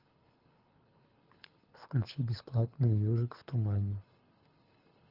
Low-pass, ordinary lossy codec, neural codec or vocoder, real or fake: 5.4 kHz; Opus, 24 kbps; codec, 24 kHz, 6 kbps, HILCodec; fake